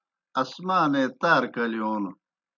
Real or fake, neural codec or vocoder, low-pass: real; none; 7.2 kHz